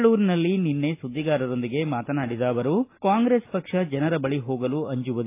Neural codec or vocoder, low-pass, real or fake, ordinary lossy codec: none; 3.6 kHz; real; AAC, 24 kbps